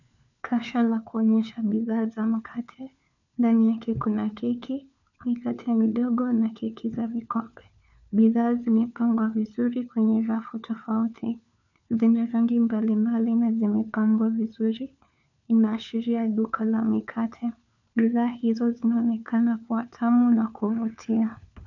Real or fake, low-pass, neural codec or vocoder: fake; 7.2 kHz; codec, 16 kHz, 4 kbps, FunCodec, trained on LibriTTS, 50 frames a second